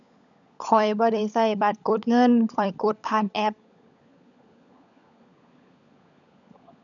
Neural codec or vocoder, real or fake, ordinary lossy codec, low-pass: codec, 16 kHz, 16 kbps, FunCodec, trained on LibriTTS, 50 frames a second; fake; none; 7.2 kHz